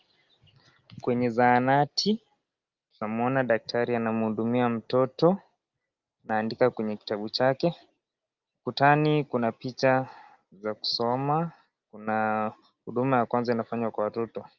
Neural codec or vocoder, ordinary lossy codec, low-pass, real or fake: none; Opus, 32 kbps; 7.2 kHz; real